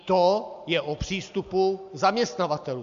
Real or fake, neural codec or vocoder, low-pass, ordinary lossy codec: real; none; 7.2 kHz; MP3, 64 kbps